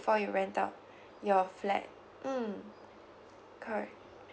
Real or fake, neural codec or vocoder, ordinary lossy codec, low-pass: real; none; none; none